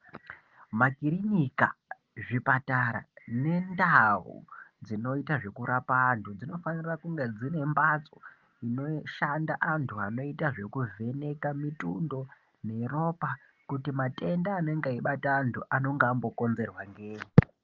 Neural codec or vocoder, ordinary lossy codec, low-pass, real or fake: none; Opus, 32 kbps; 7.2 kHz; real